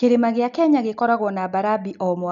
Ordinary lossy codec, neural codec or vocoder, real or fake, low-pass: none; none; real; 7.2 kHz